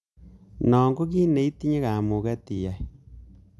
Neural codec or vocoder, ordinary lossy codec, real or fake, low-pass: none; none; real; none